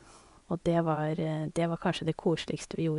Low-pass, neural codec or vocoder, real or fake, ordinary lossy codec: 10.8 kHz; vocoder, 24 kHz, 100 mel bands, Vocos; fake; none